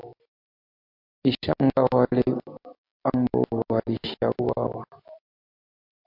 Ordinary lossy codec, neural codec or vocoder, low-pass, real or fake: MP3, 48 kbps; none; 5.4 kHz; real